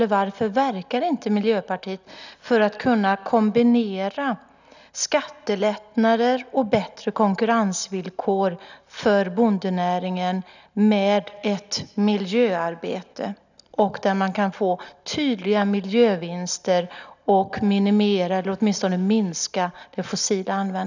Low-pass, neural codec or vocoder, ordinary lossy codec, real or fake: 7.2 kHz; none; none; real